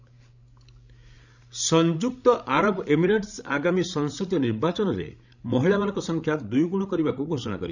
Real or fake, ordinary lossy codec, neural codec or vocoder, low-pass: fake; none; codec, 16 kHz, 16 kbps, FreqCodec, larger model; 7.2 kHz